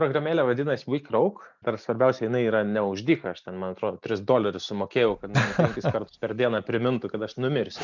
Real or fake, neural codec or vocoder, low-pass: real; none; 7.2 kHz